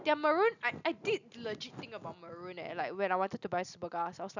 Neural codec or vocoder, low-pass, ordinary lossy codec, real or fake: none; 7.2 kHz; none; real